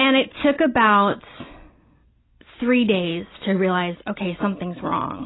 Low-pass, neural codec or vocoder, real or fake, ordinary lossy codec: 7.2 kHz; none; real; AAC, 16 kbps